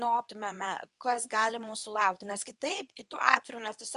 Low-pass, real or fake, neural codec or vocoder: 10.8 kHz; fake; codec, 24 kHz, 0.9 kbps, WavTokenizer, medium speech release version 2